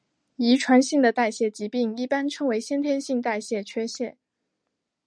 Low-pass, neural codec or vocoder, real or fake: 9.9 kHz; none; real